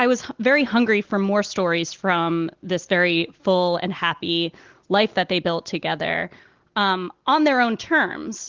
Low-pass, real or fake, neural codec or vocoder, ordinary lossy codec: 7.2 kHz; real; none; Opus, 16 kbps